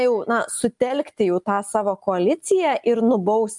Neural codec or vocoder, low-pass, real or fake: none; 10.8 kHz; real